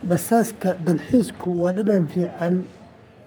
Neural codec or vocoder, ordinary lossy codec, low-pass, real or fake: codec, 44.1 kHz, 3.4 kbps, Pupu-Codec; none; none; fake